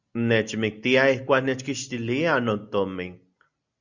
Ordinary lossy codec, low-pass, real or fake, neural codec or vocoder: Opus, 64 kbps; 7.2 kHz; real; none